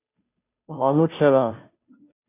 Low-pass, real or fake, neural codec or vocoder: 3.6 kHz; fake; codec, 16 kHz, 0.5 kbps, FunCodec, trained on Chinese and English, 25 frames a second